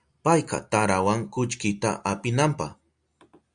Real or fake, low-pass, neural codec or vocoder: real; 9.9 kHz; none